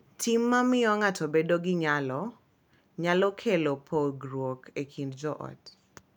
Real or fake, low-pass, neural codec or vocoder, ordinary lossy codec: real; 19.8 kHz; none; none